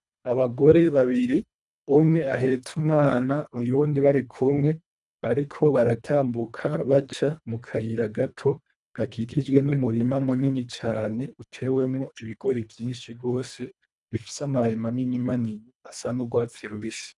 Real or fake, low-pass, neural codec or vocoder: fake; 10.8 kHz; codec, 24 kHz, 1.5 kbps, HILCodec